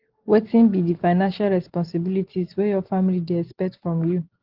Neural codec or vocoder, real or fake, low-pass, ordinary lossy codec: none; real; 5.4 kHz; Opus, 16 kbps